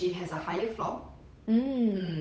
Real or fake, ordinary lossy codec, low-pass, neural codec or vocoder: fake; none; none; codec, 16 kHz, 8 kbps, FunCodec, trained on Chinese and English, 25 frames a second